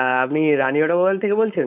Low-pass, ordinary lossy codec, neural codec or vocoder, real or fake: 3.6 kHz; none; codec, 16 kHz, 4.8 kbps, FACodec; fake